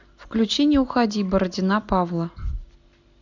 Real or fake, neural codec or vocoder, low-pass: real; none; 7.2 kHz